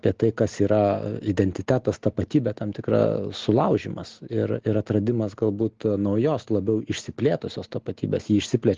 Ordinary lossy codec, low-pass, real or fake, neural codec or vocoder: Opus, 16 kbps; 7.2 kHz; real; none